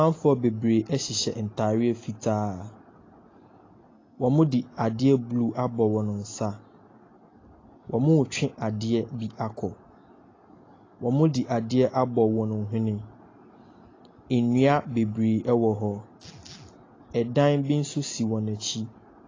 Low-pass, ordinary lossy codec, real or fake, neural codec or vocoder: 7.2 kHz; AAC, 32 kbps; real; none